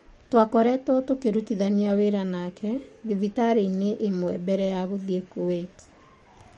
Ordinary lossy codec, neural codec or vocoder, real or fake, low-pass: MP3, 48 kbps; codec, 44.1 kHz, 7.8 kbps, DAC; fake; 19.8 kHz